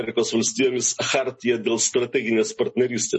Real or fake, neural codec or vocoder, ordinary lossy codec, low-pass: real; none; MP3, 32 kbps; 10.8 kHz